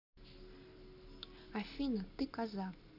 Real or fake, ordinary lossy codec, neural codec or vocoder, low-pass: real; none; none; 5.4 kHz